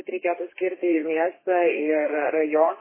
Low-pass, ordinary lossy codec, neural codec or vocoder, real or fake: 3.6 kHz; MP3, 16 kbps; codec, 32 kHz, 1.9 kbps, SNAC; fake